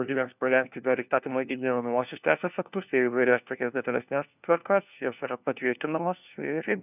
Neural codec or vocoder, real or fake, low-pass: codec, 16 kHz, 1 kbps, FunCodec, trained on LibriTTS, 50 frames a second; fake; 3.6 kHz